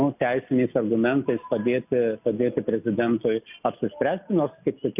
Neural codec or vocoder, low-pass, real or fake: none; 3.6 kHz; real